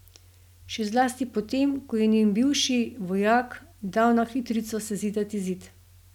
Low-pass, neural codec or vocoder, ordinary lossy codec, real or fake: 19.8 kHz; none; none; real